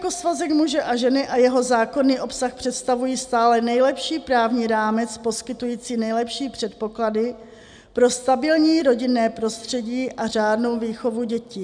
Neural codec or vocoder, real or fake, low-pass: none; real; 9.9 kHz